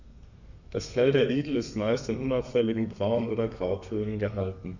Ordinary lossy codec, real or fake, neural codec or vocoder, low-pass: none; fake; codec, 32 kHz, 1.9 kbps, SNAC; 7.2 kHz